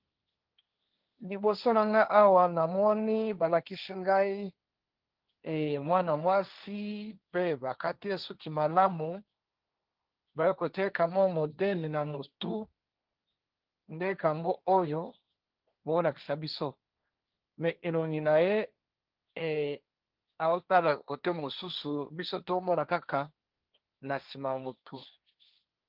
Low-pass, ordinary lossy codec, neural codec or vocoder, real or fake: 5.4 kHz; Opus, 32 kbps; codec, 16 kHz, 1.1 kbps, Voila-Tokenizer; fake